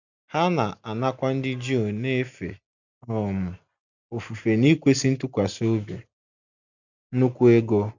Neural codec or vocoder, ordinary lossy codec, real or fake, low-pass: none; none; real; 7.2 kHz